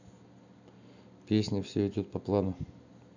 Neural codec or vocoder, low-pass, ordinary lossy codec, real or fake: none; 7.2 kHz; none; real